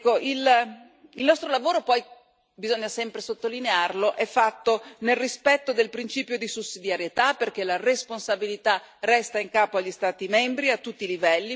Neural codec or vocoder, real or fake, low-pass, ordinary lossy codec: none; real; none; none